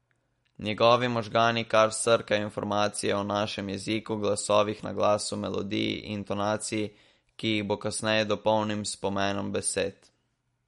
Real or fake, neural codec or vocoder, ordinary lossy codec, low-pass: real; none; MP3, 48 kbps; 19.8 kHz